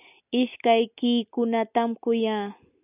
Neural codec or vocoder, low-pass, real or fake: none; 3.6 kHz; real